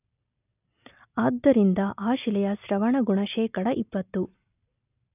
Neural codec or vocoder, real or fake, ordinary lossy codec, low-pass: none; real; none; 3.6 kHz